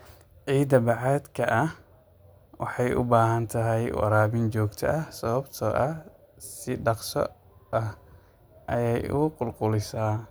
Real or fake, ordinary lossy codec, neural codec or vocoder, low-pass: real; none; none; none